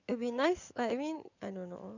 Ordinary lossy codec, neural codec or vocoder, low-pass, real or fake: none; codec, 16 kHz in and 24 kHz out, 2.2 kbps, FireRedTTS-2 codec; 7.2 kHz; fake